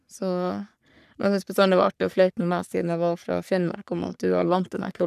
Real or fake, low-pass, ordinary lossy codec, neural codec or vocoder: fake; 14.4 kHz; none; codec, 44.1 kHz, 3.4 kbps, Pupu-Codec